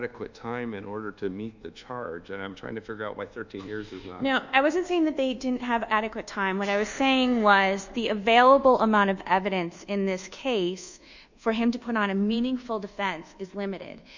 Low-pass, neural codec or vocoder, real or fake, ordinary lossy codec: 7.2 kHz; codec, 24 kHz, 1.2 kbps, DualCodec; fake; Opus, 64 kbps